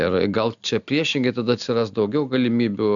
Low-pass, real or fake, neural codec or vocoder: 7.2 kHz; real; none